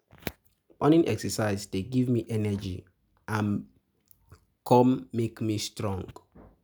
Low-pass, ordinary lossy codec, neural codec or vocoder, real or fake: none; none; none; real